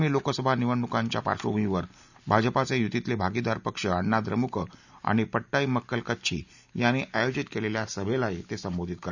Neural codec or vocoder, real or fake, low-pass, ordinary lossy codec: none; real; 7.2 kHz; none